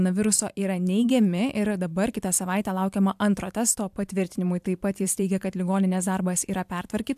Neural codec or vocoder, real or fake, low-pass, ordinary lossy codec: vocoder, 44.1 kHz, 128 mel bands every 512 samples, BigVGAN v2; fake; 14.4 kHz; AAC, 96 kbps